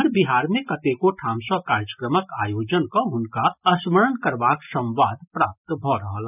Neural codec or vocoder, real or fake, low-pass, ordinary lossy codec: none; real; 3.6 kHz; none